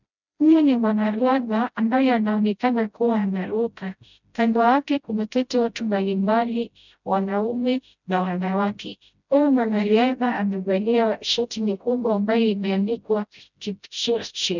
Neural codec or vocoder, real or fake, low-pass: codec, 16 kHz, 0.5 kbps, FreqCodec, smaller model; fake; 7.2 kHz